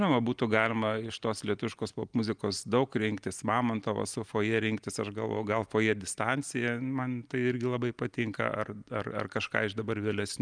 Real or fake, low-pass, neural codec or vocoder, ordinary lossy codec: real; 9.9 kHz; none; Opus, 32 kbps